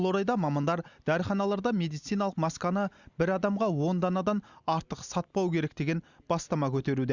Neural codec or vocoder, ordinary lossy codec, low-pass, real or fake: none; Opus, 64 kbps; 7.2 kHz; real